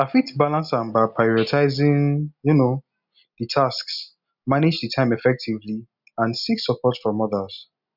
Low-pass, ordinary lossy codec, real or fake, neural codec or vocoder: 5.4 kHz; none; real; none